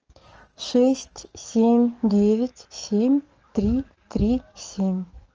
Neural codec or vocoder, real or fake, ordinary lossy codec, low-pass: codec, 44.1 kHz, 7.8 kbps, DAC; fake; Opus, 24 kbps; 7.2 kHz